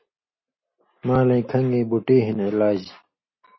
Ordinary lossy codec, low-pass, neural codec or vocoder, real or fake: MP3, 24 kbps; 7.2 kHz; none; real